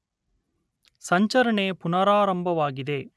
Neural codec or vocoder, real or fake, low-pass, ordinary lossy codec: none; real; none; none